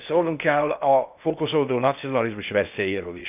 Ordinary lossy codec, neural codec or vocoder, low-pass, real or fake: none; codec, 16 kHz in and 24 kHz out, 0.6 kbps, FocalCodec, streaming, 2048 codes; 3.6 kHz; fake